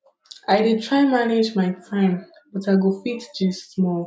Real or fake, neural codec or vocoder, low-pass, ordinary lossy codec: real; none; none; none